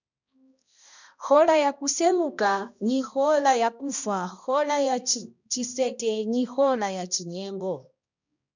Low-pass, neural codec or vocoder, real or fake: 7.2 kHz; codec, 16 kHz, 1 kbps, X-Codec, HuBERT features, trained on balanced general audio; fake